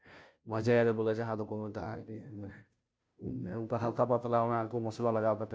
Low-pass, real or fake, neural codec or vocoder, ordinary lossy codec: none; fake; codec, 16 kHz, 0.5 kbps, FunCodec, trained on Chinese and English, 25 frames a second; none